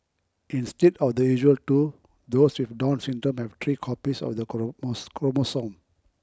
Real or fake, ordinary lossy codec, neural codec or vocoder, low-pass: real; none; none; none